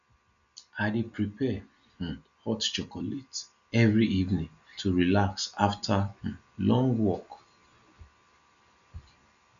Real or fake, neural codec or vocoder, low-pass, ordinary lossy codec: real; none; 7.2 kHz; none